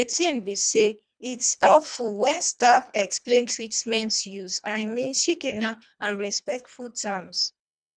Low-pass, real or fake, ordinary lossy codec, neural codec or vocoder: 9.9 kHz; fake; none; codec, 24 kHz, 1.5 kbps, HILCodec